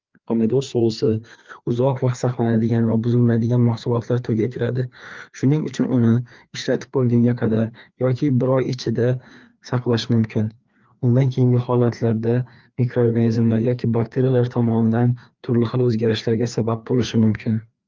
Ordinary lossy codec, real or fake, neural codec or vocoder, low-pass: Opus, 32 kbps; fake; codec, 16 kHz, 2 kbps, FreqCodec, larger model; 7.2 kHz